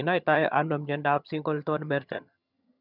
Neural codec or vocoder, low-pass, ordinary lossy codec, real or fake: vocoder, 22.05 kHz, 80 mel bands, HiFi-GAN; 5.4 kHz; none; fake